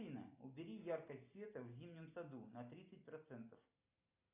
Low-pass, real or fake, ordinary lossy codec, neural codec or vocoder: 3.6 kHz; real; AAC, 32 kbps; none